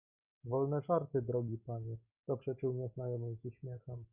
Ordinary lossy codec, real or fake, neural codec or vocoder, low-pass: Opus, 64 kbps; real; none; 3.6 kHz